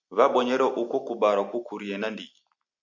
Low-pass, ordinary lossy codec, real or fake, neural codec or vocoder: 7.2 kHz; MP3, 64 kbps; real; none